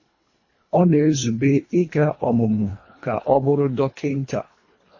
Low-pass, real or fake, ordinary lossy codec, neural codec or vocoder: 7.2 kHz; fake; MP3, 32 kbps; codec, 24 kHz, 1.5 kbps, HILCodec